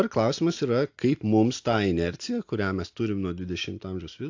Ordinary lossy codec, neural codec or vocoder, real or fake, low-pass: AAC, 48 kbps; none; real; 7.2 kHz